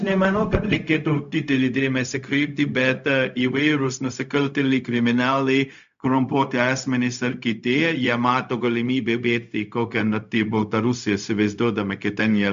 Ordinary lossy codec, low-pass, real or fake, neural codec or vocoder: MP3, 64 kbps; 7.2 kHz; fake; codec, 16 kHz, 0.4 kbps, LongCat-Audio-Codec